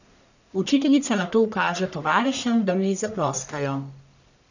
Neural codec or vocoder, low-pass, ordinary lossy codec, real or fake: codec, 44.1 kHz, 1.7 kbps, Pupu-Codec; 7.2 kHz; none; fake